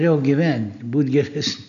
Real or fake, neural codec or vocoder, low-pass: real; none; 7.2 kHz